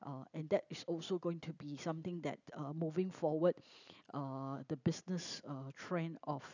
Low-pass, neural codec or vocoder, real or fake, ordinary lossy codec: 7.2 kHz; none; real; none